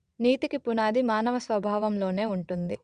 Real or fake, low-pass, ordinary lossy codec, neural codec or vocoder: fake; 9.9 kHz; Opus, 64 kbps; vocoder, 22.05 kHz, 80 mel bands, WaveNeXt